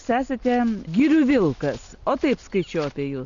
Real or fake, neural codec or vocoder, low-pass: real; none; 7.2 kHz